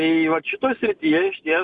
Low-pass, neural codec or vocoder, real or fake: 10.8 kHz; none; real